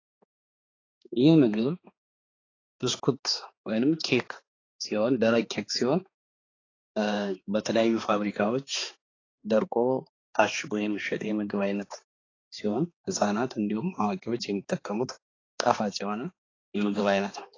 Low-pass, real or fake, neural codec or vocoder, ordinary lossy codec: 7.2 kHz; fake; codec, 16 kHz, 2 kbps, X-Codec, HuBERT features, trained on balanced general audio; AAC, 32 kbps